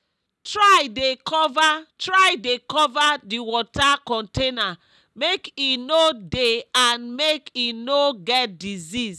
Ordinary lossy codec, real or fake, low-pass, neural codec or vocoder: none; real; none; none